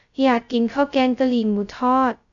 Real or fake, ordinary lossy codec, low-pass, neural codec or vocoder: fake; AAC, 64 kbps; 7.2 kHz; codec, 16 kHz, 0.2 kbps, FocalCodec